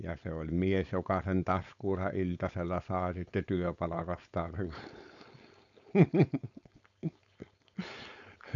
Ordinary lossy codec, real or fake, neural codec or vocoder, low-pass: none; fake; codec, 16 kHz, 4.8 kbps, FACodec; 7.2 kHz